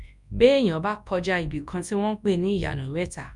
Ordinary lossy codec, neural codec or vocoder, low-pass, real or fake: none; codec, 24 kHz, 0.9 kbps, WavTokenizer, large speech release; 10.8 kHz; fake